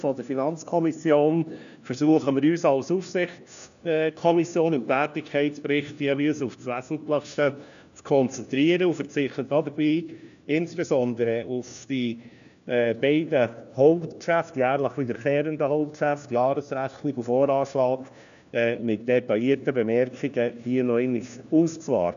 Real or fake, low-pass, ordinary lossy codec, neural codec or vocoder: fake; 7.2 kHz; none; codec, 16 kHz, 1 kbps, FunCodec, trained on LibriTTS, 50 frames a second